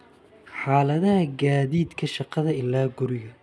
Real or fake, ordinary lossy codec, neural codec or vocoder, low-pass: real; none; none; none